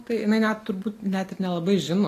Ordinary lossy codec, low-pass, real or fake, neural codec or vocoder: AAC, 48 kbps; 14.4 kHz; real; none